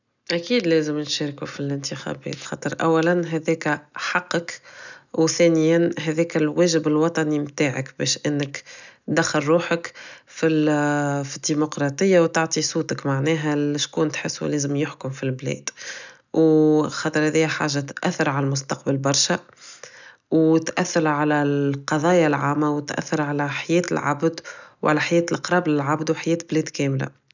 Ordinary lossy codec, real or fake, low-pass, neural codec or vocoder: none; real; 7.2 kHz; none